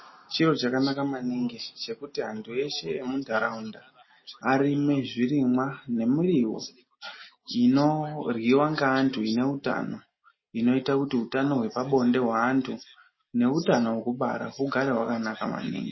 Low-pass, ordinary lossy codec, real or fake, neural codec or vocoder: 7.2 kHz; MP3, 24 kbps; real; none